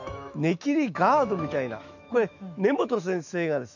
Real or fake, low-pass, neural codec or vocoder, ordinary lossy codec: fake; 7.2 kHz; autoencoder, 48 kHz, 128 numbers a frame, DAC-VAE, trained on Japanese speech; none